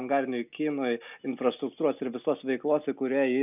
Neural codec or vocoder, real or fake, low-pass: none; real; 3.6 kHz